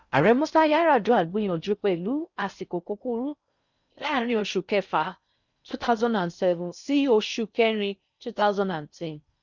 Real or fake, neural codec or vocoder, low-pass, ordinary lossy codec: fake; codec, 16 kHz in and 24 kHz out, 0.6 kbps, FocalCodec, streaming, 4096 codes; 7.2 kHz; none